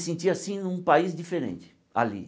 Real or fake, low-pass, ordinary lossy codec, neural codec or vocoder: real; none; none; none